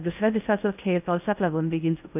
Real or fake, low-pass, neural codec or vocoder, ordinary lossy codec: fake; 3.6 kHz; codec, 16 kHz in and 24 kHz out, 0.6 kbps, FocalCodec, streaming, 4096 codes; none